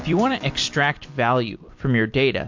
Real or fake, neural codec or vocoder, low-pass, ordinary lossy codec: fake; vocoder, 44.1 kHz, 128 mel bands every 256 samples, BigVGAN v2; 7.2 kHz; MP3, 48 kbps